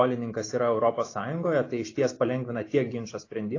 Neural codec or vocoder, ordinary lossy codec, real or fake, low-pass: none; AAC, 32 kbps; real; 7.2 kHz